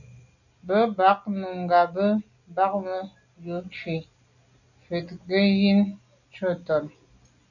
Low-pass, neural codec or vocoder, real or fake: 7.2 kHz; none; real